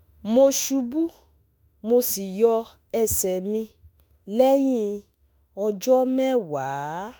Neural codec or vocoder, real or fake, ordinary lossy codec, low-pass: autoencoder, 48 kHz, 32 numbers a frame, DAC-VAE, trained on Japanese speech; fake; none; none